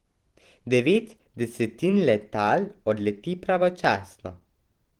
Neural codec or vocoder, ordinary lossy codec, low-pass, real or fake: vocoder, 44.1 kHz, 128 mel bands every 512 samples, BigVGAN v2; Opus, 16 kbps; 19.8 kHz; fake